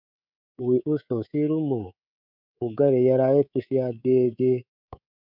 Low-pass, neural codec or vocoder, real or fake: 5.4 kHz; codec, 24 kHz, 3.1 kbps, DualCodec; fake